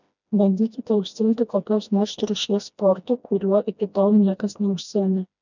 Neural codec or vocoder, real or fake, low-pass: codec, 16 kHz, 1 kbps, FreqCodec, smaller model; fake; 7.2 kHz